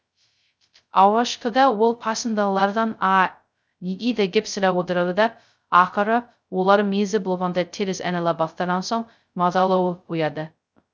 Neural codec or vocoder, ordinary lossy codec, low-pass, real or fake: codec, 16 kHz, 0.2 kbps, FocalCodec; none; none; fake